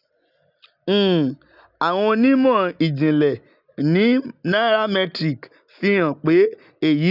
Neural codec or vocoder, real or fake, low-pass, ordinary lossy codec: none; real; 5.4 kHz; none